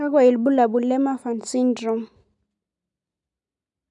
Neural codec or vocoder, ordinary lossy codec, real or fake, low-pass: none; none; real; 10.8 kHz